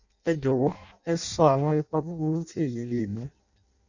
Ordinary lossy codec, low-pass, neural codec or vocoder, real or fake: none; 7.2 kHz; codec, 16 kHz in and 24 kHz out, 0.6 kbps, FireRedTTS-2 codec; fake